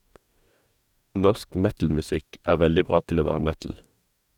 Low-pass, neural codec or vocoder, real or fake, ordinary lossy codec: 19.8 kHz; codec, 44.1 kHz, 2.6 kbps, DAC; fake; none